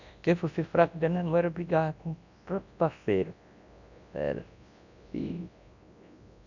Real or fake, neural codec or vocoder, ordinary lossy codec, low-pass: fake; codec, 24 kHz, 0.9 kbps, WavTokenizer, large speech release; none; 7.2 kHz